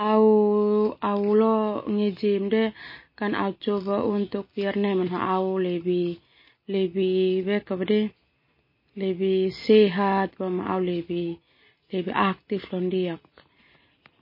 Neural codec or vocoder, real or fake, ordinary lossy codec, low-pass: none; real; MP3, 24 kbps; 5.4 kHz